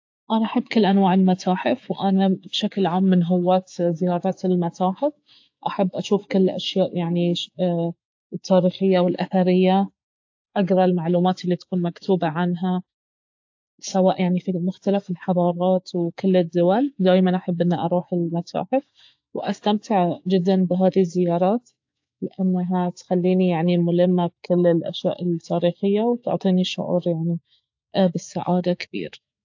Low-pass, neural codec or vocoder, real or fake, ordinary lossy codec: 7.2 kHz; autoencoder, 48 kHz, 128 numbers a frame, DAC-VAE, trained on Japanese speech; fake; AAC, 48 kbps